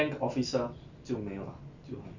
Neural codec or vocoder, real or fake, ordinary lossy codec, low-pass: none; real; none; 7.2 kHz